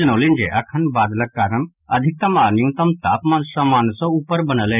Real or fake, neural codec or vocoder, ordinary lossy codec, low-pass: real; none; none; 3.6 kHz